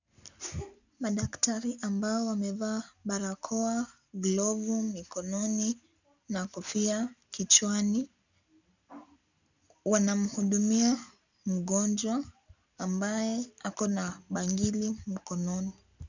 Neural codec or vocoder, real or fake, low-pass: none; real; 7.2 kHz